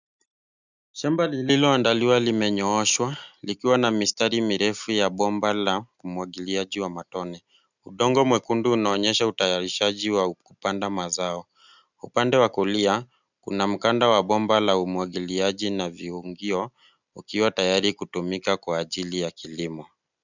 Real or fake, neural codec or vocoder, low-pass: real; none; 7.2 kHz